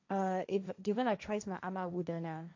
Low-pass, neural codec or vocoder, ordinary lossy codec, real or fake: 7.2 kHz; codec, 16 kHz, 1.1 kbps, Voila-Tokenizer; AAC, 48 kbps; fake